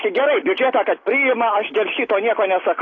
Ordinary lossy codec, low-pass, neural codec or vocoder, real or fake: AAC, 32 kbps; 7.2 kHz; none; real